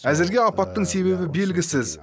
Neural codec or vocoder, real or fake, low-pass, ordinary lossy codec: none; real; none; none